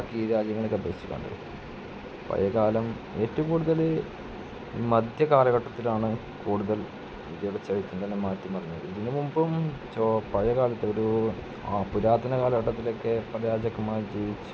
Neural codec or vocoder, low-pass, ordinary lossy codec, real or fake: none; none; none; real